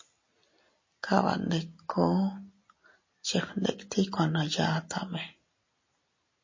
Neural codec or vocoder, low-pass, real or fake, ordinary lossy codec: none; 7.2 kHz; real; MP3, 32 kbps